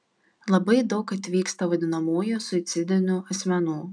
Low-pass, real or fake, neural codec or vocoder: 9.9 kHz; real; none